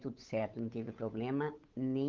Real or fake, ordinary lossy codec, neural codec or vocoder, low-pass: fake; Opus, 24 kbps; codec, 16 kHz, 8 kbps, FunCodec, trained on Chinese and English, 25 frames a second; 7.2 kHz